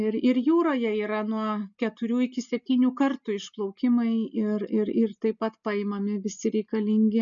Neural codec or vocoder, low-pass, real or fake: none; 7.2 kHz; real